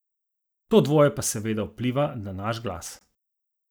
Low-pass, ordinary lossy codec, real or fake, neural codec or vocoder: none; none; real; none